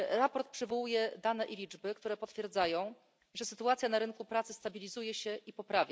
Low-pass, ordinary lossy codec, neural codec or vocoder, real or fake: none; none; none; real